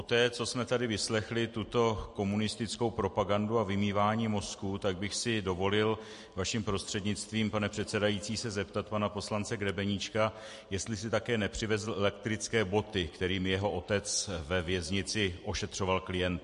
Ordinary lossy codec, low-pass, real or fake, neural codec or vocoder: MP3, 48 kbps; 14.4 kHz; real; none